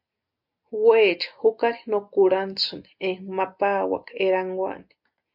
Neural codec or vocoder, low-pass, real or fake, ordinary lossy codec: none; 5.4 kHz; real; MP3, 48 kbps